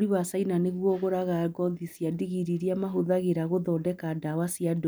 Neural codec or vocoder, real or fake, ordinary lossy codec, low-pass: none; real; none; none